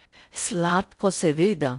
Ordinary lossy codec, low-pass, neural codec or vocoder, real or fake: none; 10.8 kHz; codec, 16 kHz in and 24 kHz out, 0.6 kbps, FocalCodec, streaming, 4096 codes; fake